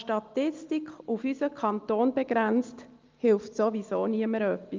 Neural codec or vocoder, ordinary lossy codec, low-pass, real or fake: none; Opus, 32 kbps; 7.2 kHz; real